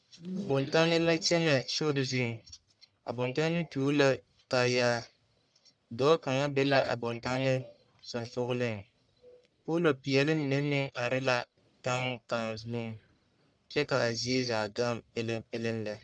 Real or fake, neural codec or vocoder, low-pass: fake; codec, 44.1 kHz, 1.7 kbps, Pupu-Codec; 9.9 kHz